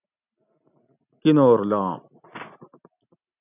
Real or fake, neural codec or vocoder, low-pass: real; none; 3.6 kHz